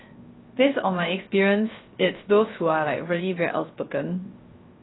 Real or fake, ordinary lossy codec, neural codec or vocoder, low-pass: fake; AAC, 16 kbps; codec, 16 kHz, 0.3 kbps, FocalCodec; 7.2 kHz